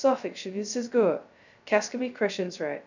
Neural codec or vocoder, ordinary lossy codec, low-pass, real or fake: codec, 16 kHz, 0.2 kbps, FocalCodec; none; 7.2 kHz; fake